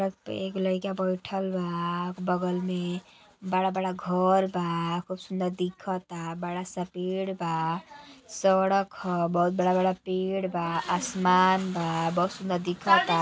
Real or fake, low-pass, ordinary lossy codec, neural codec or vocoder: real; none; none; none